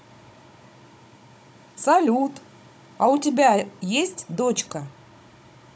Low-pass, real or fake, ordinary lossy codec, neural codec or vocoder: none; fake; none; codec, 16 kHz, 16 kbps, FunCodec, trained on Chinese and English, 50 frames a second